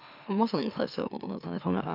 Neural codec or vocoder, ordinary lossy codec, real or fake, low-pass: autoencoder, 44.1 kHz, a latent of 192 numbers a frame, MeloTTS; none; fake; 5.4 kHz